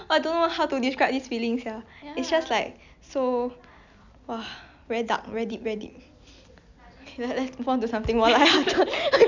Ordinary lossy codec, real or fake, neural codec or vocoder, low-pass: none; real; none; 7.2 kHz